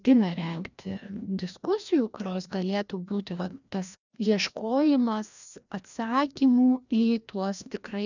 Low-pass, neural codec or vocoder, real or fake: 7.2 kHz; codec, 16 kHz, 1 kbps, FreqCodec, larger model; fake